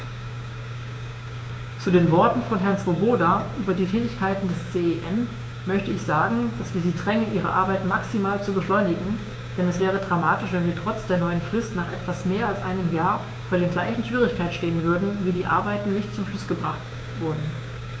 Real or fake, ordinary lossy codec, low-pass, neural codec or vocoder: fake; none; none; codec, 16 kHz, 6 kbps, DAC